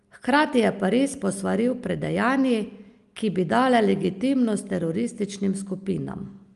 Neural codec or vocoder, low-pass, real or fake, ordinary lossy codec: none; 10.8 kHz; real; Opus, 32 kbps